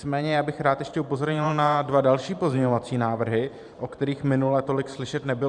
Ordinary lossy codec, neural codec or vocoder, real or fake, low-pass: Opus, 64 kbps; vocoder, 44.1 kHz, 128 mel bands every 512 samples, BigVGAN v2; fake; 10.8 kHz